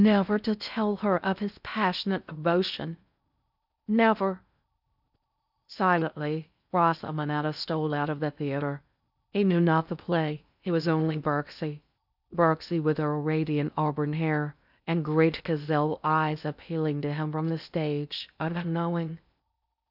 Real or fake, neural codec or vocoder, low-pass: fake; codec, 16 kHz in and 24 kHz out, 0.6 kbps, FocalCodec, streaming, 4096 codes; 5.4 kHz